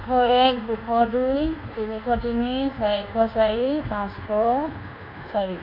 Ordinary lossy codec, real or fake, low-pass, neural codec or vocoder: none; fake; 5.4 kHz; codec, 24 kHz, 1.2 kbps, DualCodec